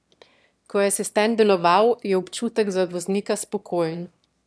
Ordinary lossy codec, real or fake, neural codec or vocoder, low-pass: none; fake; autoencoder, 22.05 kHz, a latent of 192 numbers a frame, VITS, trained on one speaker; none